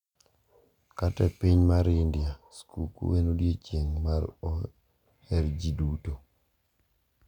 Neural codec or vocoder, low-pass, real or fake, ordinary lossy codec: none; 19.8 kHz; real; none